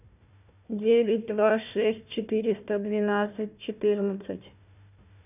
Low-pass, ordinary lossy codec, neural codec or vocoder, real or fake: 3.6 kHz; none; codec, 16 kHz, 1 kbps, FunCodec, trained on Chinese and English, 50 frames a second; fake